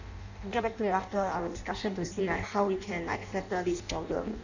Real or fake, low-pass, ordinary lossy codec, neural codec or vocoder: fake; 7.2 kHz; none; codec, 16 kHz in and 24 kHz out, 0.6 kbps, FireRedTTS-2 codec